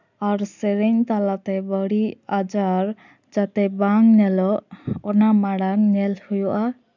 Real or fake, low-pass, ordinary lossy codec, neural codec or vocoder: real; 7.2 kHz; none; none